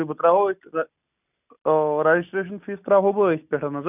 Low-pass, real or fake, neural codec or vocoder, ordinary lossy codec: 3.6 kHz; real; none; none